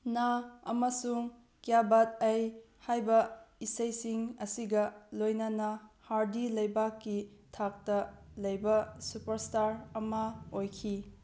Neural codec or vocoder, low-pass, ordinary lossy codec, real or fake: none; none; none; real